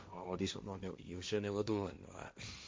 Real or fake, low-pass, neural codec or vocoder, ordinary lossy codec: fake; none; codec, 16 kHz, 1.1 kbps, Voila-Tokenizer; none